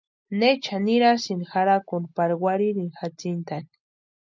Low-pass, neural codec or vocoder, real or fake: 7.2 kHz; none; real